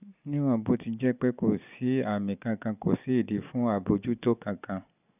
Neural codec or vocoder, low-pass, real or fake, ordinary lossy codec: none; 3.6 kHz; real; none